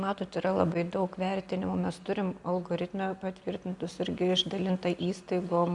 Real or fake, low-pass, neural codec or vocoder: real; 10.8 kHz; none